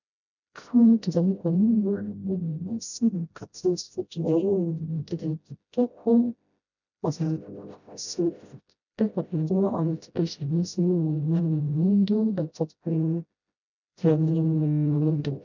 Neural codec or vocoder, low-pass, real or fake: codec, 16 kHz, 0.5 kbps, FreqCodec, smaller model; 7.2 kHz; fake